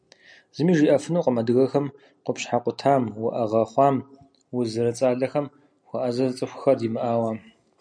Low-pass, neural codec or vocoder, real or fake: 9.9 kHz; none; real